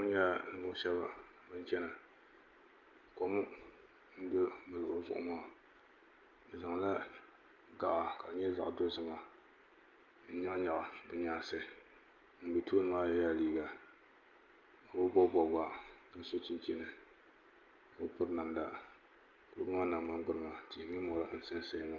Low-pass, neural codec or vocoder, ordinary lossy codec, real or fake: 7.2 kHz; none; Opus, 32 kbps; real